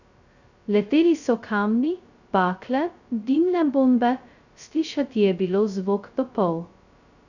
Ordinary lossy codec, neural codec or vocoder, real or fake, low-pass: none; codec, 16 kHz, 0.2 kbps, FocalCodec; fake; 7.2 kHz